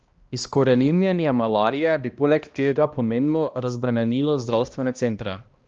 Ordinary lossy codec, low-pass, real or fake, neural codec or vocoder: Opus, 24 kbps; 7.2 kHz; fake; codec, 16 kHz, 1 kbps, X-Codec, HuBERT features, trained on balanced general audio